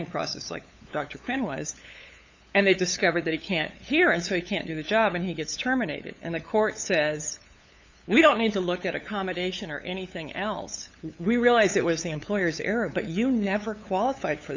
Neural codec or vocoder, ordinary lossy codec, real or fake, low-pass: codec, 16 kHz, 8 kbps, FunCodec, trained on LibriTTS, 25 frames a second; MP3, 64 kbps; fake; 7.2 kHz